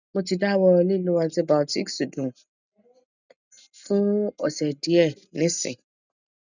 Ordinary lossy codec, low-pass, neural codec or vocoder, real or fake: none; 7.2 kHz; none; real